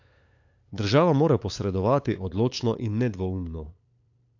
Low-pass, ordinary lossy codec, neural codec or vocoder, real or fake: 7.2 kHz; AAC, 48 kbps; codec, 16 kHz, 8 kbps, FunCodec, trained on LibriTTS, 25 frames a second; fake